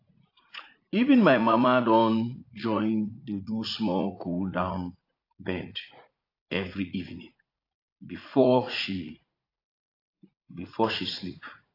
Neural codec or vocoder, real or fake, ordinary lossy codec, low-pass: vocoder, 44.1 kHz, 80 mel bands, Vocos; fake; AAC, 32 kbps; 5.4 kHz